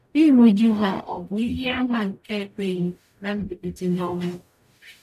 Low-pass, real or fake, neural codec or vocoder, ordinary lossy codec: 14.4 kHz; fake; codec, 44.1 kHz, 0.9 kbps, DAC; none